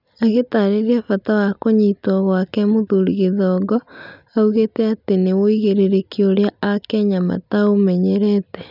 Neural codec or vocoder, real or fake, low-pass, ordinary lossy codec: none; real; 5.4 kHz; none